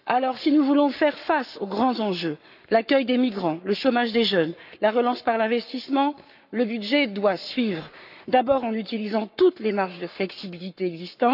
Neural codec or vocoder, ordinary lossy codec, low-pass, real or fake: codec, 44.1 kHz, 7.8 kbps, Pupu-Codec; none; 5.4 kHz; fake